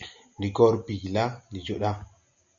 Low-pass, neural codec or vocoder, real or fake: 7.2 kHz; none; real